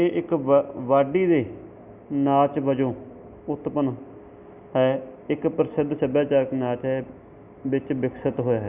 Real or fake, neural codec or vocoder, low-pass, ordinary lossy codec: real; none; 3.6 kHz; Opus, 64 kbps